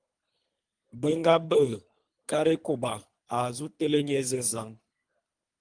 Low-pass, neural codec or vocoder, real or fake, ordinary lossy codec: 9.9 kHz; codec, 24 kHz, 3 kbps, HILCodec; fake; Opus, 32 kbps